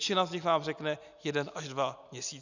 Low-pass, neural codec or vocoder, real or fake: 7.2 kHz; none; real